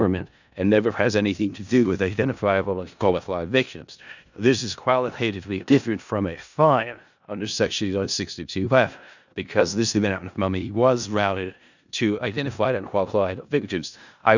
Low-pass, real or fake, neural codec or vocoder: 7.2 kHz; fake; codec, 16 kHz in and 24 kHz out, 0.4 kbps, LongCat-Audio-Codec, four codebook decoder